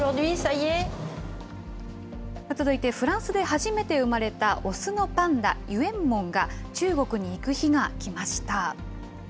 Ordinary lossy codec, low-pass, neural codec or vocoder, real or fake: none; none; none; real